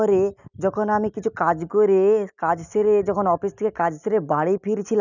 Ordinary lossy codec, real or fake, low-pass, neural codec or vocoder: none; real; 7.2 kHz; none